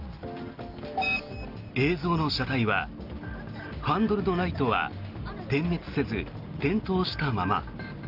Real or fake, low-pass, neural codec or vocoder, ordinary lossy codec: real; 5.4 kHz; none; Opus, 32 kbps